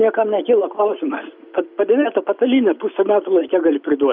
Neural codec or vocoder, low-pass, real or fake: none; 5.4 kHz; real